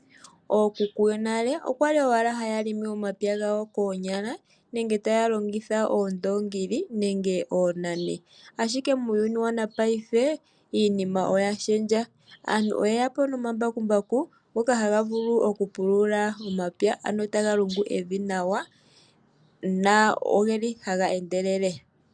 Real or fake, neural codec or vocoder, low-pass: real; none; 9.9 kHz